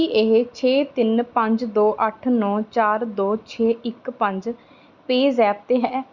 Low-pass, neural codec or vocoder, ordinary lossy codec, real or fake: 7.2 kHz; none; Opus, 64 kbps; real